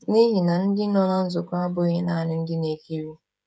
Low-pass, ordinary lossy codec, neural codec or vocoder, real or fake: none; none; codec, 16 kHz, 16 kbps, FreqCodec, smaller model; fake